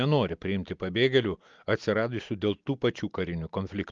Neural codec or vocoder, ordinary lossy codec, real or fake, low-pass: none; Opus, 32 kbps; real; 7.2 kHz